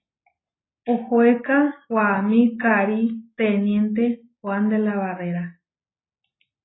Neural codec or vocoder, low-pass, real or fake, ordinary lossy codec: none; 7.2 kHz; real; AAC, 16 kbps